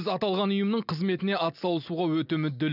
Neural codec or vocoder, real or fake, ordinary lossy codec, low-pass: none; real; none; 5.4 kHz